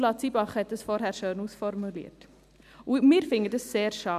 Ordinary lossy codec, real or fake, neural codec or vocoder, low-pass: none; real; none; 14.4 kHz